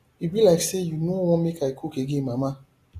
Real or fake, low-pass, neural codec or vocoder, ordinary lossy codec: real; 14.4 kHz; none; AAC, 48 kbps